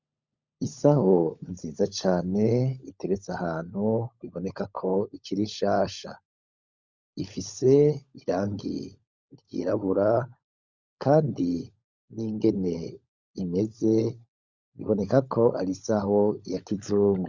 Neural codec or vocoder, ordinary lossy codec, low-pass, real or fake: codec, 16 kHz, 16 kbps, FunCodec, trained on LibriTTS, 50 frames a second; Opus, 64 kbps; 7.2 kHz; fake